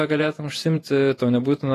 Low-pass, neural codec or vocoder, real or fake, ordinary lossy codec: 14.4 kHz; vocoder, 48 kHz, 128 mel bands, Vocos; fake; AAC, 48 kbps